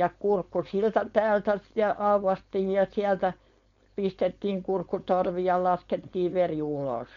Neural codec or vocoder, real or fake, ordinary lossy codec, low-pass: codec, 16 kHz, 4.8 kbps, FACodec; fake; MP3, 48 kbps; 7.2 kHz